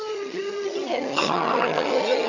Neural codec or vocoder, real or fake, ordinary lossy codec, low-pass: vocoder, 22.05 kHz, 80 mel bands, HiFi-GAN; fake; none; 7.2 kHz